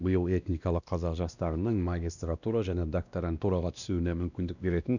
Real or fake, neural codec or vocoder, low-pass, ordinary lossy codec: fake; codec, 16 kHz, 1 kbps, X-Codec, WavLM features, trained on Multilingual LibriSpeech; 7.2 kHz; none